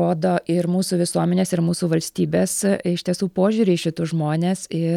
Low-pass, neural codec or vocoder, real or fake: 19.8 kHz; none; real